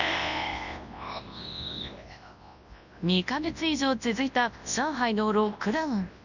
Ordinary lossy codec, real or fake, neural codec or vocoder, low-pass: none; fake; codec, 24 kHz, 0.9 kbps, WavTokenizer, large speech release; 7.2 kHz